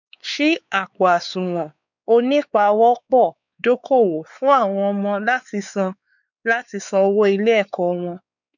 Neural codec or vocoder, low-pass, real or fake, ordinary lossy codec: codec, 16 kHz, 4 kbps, X-Codec, WavLM features, trained on Multilingual LibriSpeech; 7.2 kHz; fake; none